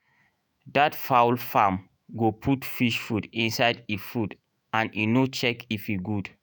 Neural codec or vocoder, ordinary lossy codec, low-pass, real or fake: autoencoder, 48 kHz, 128 numbers a frame, DAC-VAE, trained on Japanese speech; none; none; fake